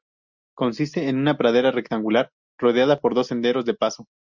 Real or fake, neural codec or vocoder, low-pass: real; none; 7.2 kHz